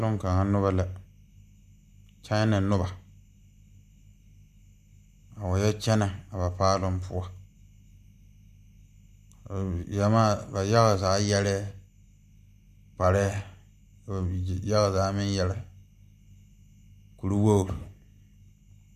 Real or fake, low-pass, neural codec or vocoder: real; 14.4 kHz; none